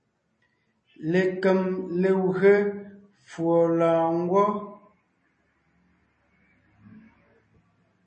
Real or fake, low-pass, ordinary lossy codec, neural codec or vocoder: real; 10.8 kHz; MP3, 32 kbps; none